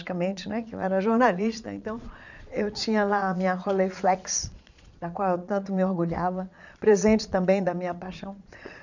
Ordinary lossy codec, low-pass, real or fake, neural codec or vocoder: none; 7.2 kHz; fake; vocoder, 22.05 kHz, 80 mel bands, Vocos